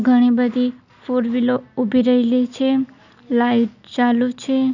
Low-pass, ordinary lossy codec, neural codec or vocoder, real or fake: 7.2 kHz; none; none; real